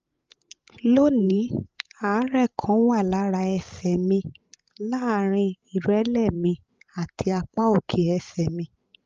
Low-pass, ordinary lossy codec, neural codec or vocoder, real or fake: 7.2 kHz; Opus, 24 kbps; codec, 16 kHz, 16 kbps, FreqCodec, larger model; fake